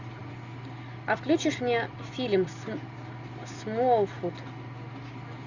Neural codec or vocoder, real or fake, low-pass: none; real; 7.2 kHz